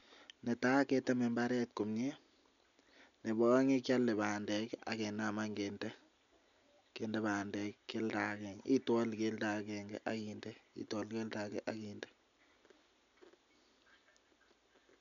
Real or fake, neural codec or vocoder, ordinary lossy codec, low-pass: real; none; none; 7.2 kHz